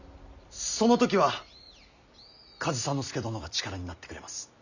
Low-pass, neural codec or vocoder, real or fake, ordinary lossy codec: 7.2 kHz; none; real; none